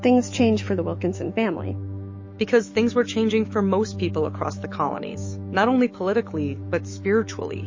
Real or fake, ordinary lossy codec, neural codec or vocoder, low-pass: fake; MP3, 32 kbps; autoencoder, 48 kHz, 128 numbers a frame, DAC-VAE, trained on Japanese speech; 7.2 kHz